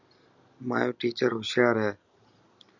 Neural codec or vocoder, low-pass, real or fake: none; 7.2 kHz; real